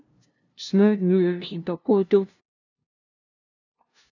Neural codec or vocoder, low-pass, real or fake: codec, 16 kHz, 0.5 kbps, FunCodec, trained on LibriTTS, 25 frames a second; 7.2 kHz; fake